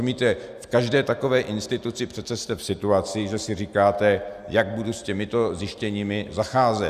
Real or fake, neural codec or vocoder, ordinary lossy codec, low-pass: real; none; Opus, 64 kbps; 14.4 kHz